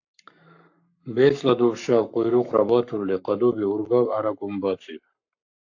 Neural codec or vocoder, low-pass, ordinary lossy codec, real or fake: codec, 44.1 kHz, 7.8 kbps, Pupu-Codec; 7.2 kHz; AAC, 48 kbps; fake